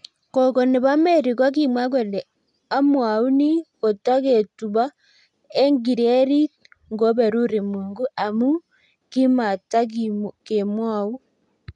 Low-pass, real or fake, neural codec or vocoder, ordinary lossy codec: 10.8 kHz; real; none; none